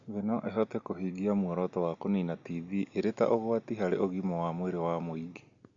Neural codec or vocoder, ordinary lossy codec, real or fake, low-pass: none; Opus, 64 kbps; real; 7.2 kHz